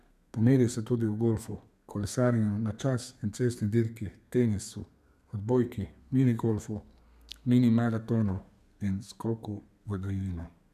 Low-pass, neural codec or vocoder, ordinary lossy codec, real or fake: 14.4 kHz; codec, 32 kHz, 1.9 kbps, SNAC; none; fake